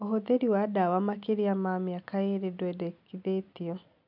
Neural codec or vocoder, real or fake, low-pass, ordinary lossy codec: none; real; 5.4 kHz; none